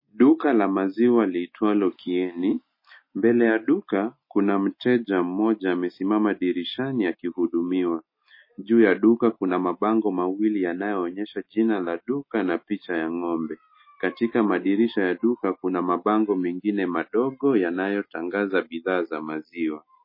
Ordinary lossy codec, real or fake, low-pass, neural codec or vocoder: MP3, 24 kbps; real; 5.4 kHz; none